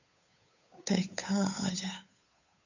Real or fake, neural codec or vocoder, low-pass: fake; codec, 16 kHz, 8 kbps, FunCodec, trained on Chinese and English, 25 frames a second; 7.2 kHz